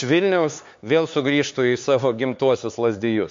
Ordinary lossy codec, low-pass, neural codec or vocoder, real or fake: MP3, 48 kbps; 7.2 kHz; codec, 16 kHz, 4 kbps, X-Codec, HuBERT features, trained on LibriSpeech; fake